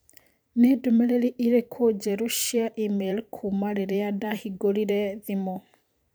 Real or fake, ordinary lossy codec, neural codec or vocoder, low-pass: fake; none; vocoder, 44.1 kHz, 128 mel bands every 512 samples, BigVGAN v2; none